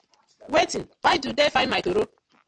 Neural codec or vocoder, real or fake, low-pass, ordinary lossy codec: none; real; 9.9 kHz; AAC, 64 kbps